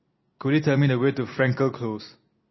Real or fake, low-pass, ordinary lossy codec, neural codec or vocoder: real; 7.2 kHz; MP3, 24 kbps; none